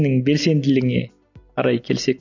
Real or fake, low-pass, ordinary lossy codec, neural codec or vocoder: real; 7.2 kHz; none; none